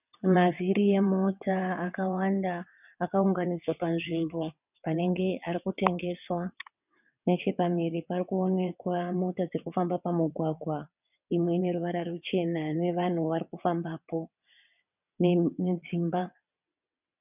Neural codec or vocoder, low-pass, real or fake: vocoder, 22.05 kHz, 80 mel bands, WaveNeXt; 3.6 kHz; fake